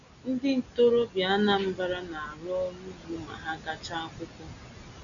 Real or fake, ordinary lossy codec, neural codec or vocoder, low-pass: real; none; none; 7.2 kHz